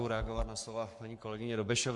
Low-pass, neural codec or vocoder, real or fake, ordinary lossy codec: 10.8 kHz; codec, 44.1 kHz, 7.8 kbps, Pupu-Codec; fake; MP3, 64 kbps